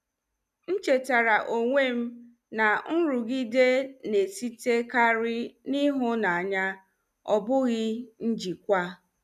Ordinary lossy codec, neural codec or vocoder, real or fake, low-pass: none; none; real; 14.4 kHz